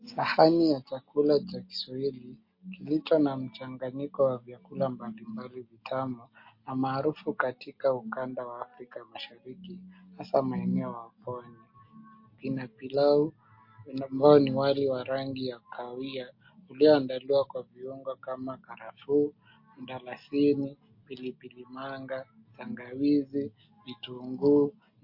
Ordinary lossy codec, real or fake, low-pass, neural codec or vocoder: MP3, 32 kbps; real; 5.4 kHz; none